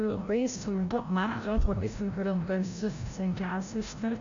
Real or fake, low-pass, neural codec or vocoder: fake; 7.2 kHz; codec, 16 kHz, 0.5 kbps, FreqCodec, larger model